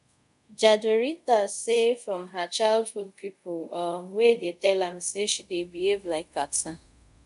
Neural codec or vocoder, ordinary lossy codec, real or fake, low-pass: codec, 24 kHz, 0.5 kbps, DualCodec; none; fake; 10.8 kHz